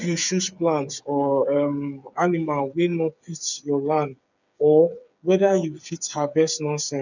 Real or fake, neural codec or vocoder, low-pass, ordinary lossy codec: fake; codec, 16 kHz, 8 kbps, FreqCodec, smaller model; 7.2 kHz; none